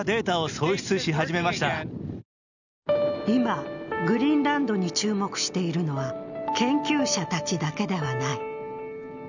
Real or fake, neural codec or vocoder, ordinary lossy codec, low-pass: real; none; none; 7.2 kHz